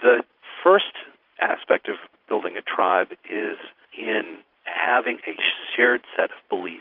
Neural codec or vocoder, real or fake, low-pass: vocoder, 22.05 kHz, 80 mel bands, Vocos; fake; 5.4 kHz